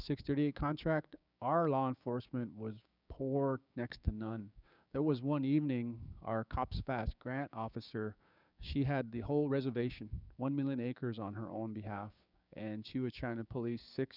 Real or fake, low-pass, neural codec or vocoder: fake; 5.4 kHz; codec, 16 kHz, 6 kbps, DAC